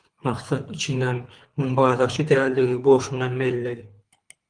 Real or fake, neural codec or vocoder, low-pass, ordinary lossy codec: fake; codec, 24 kHz, 3 kbps, HILCodec; 9.9 kHz; Opus, 32 kbps